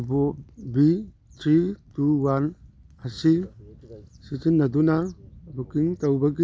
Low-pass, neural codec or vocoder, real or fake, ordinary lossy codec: none; none; real; none